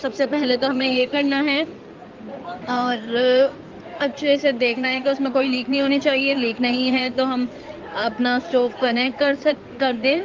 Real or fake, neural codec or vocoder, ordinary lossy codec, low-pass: fake; codec, 16 kHz in and 24 kHz out, 2.2 kbps, FireRedTTS-2 codec; Opus, 32 kbps; 7.2 kHz